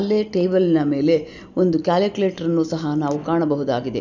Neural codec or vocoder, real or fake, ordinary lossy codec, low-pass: none; real; none; 7.2 kHz